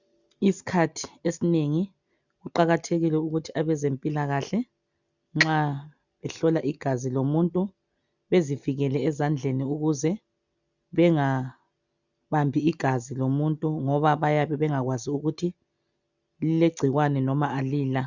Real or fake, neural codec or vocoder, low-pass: real; none; 7.2 kHz